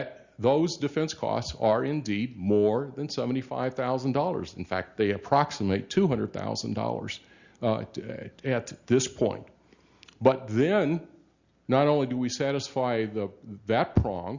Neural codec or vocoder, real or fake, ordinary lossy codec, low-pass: none; real; Opus, 64 kbps; 7.2 kHz